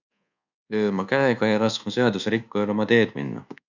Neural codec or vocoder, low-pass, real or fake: codec, 24 kHz, 1.2 kbps, DualCodec; 7.2 kHz; fake